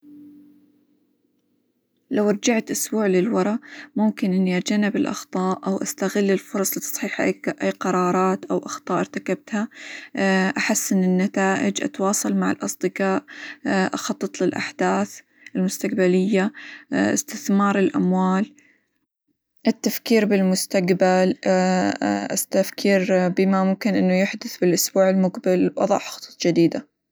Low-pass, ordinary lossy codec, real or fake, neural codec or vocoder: none; none; real; none